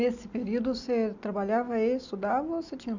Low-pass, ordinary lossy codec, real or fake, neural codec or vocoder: 7.2 kHz; none; real; none